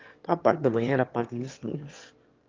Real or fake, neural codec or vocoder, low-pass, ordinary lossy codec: fake; autoencoder, 22.05 kHz, a latent of 192 numbers a frame, VITS, trained on one speaker; 7.2 kHz; Opus, 24 kbps